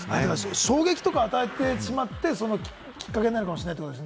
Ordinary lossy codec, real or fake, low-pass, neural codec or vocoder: none; real; none; none